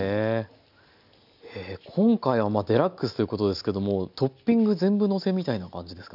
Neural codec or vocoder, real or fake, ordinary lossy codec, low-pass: none; real; none; 5.4 kHz